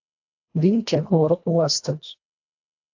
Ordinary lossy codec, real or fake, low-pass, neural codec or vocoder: AAC, 48 kbps; fake; 7.2 kHz; codec, 24 kHz, 1.5 kbps, HILCodec